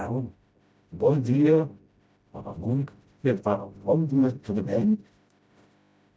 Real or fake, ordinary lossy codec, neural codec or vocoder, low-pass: fake; none; codec, 16 kHz, 0.5 kbps, FreqCodec, smaller model; none